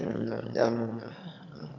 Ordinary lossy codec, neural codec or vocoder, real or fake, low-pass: none; autoencoder, 22.05 kHz, a latent of 192 numbers a frame, VITS, trained on one speaker; fake; 7.2 kHz